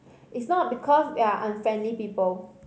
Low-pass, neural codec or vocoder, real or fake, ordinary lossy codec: none; none; real; none